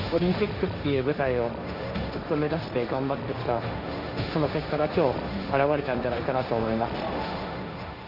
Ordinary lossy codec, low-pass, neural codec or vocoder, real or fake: none; 5.4 kHz; codec, 16 kHz, 1.1 kbps, Voila-Tokenizer; fake